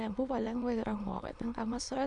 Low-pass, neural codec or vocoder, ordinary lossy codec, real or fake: 9.9 kHz; autoencoder, 22.05 kHz, a latent of 192 numbers a frame, VITS, trained on many speakers; MP3, 96 kbps; fake